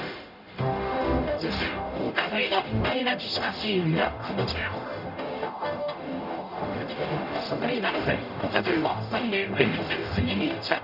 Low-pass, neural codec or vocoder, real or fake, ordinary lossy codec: 5.4 kHz; codec, 44.1 kHz, 0.9 kbps, DAC; fake; none